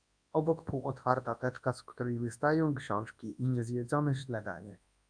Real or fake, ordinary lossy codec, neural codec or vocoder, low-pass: fake; AAC, 64 kbps; codec, 24 kHz, 0.9 kbps, WavTokenizer, large speech release; 9.9 kHz